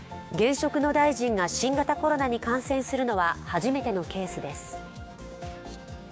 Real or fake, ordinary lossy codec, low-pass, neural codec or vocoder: fake; none; none; codec, 16 kHz, 6 kbps, DAC